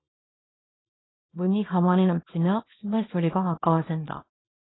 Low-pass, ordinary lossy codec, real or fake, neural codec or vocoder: 7.2 kHz; AAC, 16 kbps; fake; codec, 24 kHz, 0.9 kbps, WavTokenizer, small release